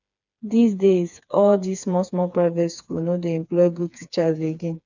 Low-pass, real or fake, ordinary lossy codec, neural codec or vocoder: 7.2 kHz; fake; none; codec, 16 kHz, 4 kbps, FreqCodec, smaller model